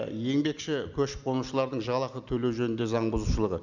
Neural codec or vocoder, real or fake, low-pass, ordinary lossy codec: none; real; 7.2 kHz; none